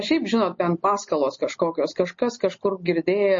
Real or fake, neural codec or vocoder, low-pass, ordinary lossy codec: real; none; 7.2 kHz; MP3, 32 kbps